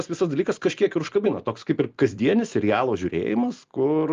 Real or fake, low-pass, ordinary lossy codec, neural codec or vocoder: real; 7.2 kHz; Opus, 32 kbps; none